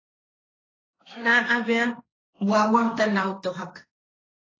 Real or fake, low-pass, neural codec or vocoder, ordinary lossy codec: fake; 7.2 kHz; codec, 16 kHz, 1.1 kbps, Voila-Tokenizer; AAC, 32 kbps